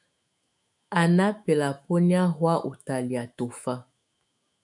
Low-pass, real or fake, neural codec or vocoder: 10.8 kHz; fake; autoencoder, 48 kHz, 128 numbers a frame, DAC-VAE, trained on Japanese speech